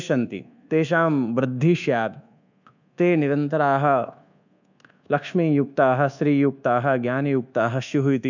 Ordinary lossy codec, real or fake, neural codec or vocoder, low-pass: none; fake; codec, 24 kHz, 1.2 kbps, DualCodec; 7.2 kHz